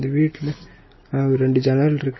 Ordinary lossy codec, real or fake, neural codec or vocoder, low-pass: MP3, 24 kbps; real; none; 7.2 kHz